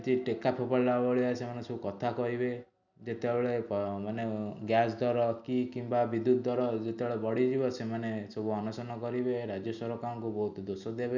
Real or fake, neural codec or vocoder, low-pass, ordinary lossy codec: real; none; 7.2 kHz; none